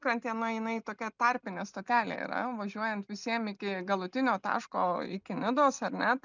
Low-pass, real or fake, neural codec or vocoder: 7.2 kHz; real; none